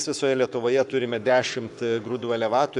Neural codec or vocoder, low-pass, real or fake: codec, 44.1 kHz, 7.8 kbps, Pupu-Codec; 10.8 kHz; fake